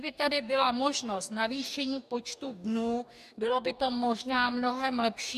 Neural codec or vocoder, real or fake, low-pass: codec, 44.1 kHz, 2.6 kbps, DAC; fake; 14.4 kHz